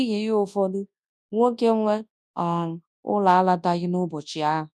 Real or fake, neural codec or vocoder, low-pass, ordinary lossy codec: fake; codec, 24 kHz, 0.9 kbps, WavTokenizer, large speech release; none; none